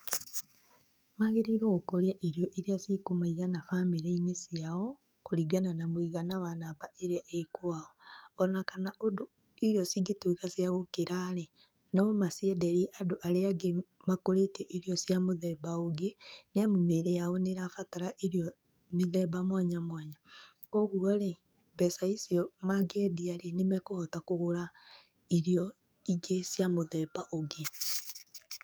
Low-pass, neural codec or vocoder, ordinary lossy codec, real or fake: none; codec, 44.1 kHz, 7.8 kbps, DAC; none; fake